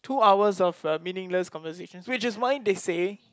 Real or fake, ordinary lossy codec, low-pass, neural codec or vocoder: real; none; none; none